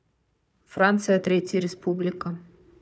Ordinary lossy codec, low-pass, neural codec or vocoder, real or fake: none; none; codec, 16 kHz, 4 kbps, FunCodec, trained on Chinese and English, 50 frames a second; fake